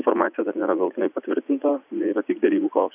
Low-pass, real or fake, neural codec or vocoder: 3.6 kHz; fake; vocoder, 44.1 kHz, 80 mel bands, Vocos